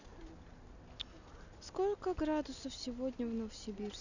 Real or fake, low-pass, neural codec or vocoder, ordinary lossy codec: real; 7.2 kHz; none; none